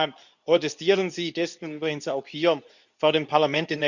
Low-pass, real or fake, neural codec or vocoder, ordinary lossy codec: 7.2 kHz; fake; codec, 24 kHz, 0.9 kbps, WavTokenizer, medium speech release version 2; none